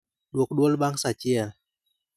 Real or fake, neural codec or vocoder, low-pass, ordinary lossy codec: real; none; 14.4 kHz; none